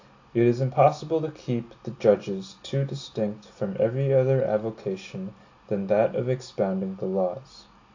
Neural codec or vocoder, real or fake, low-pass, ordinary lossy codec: none; real; 7.2 kHz; AAC, 48 kbps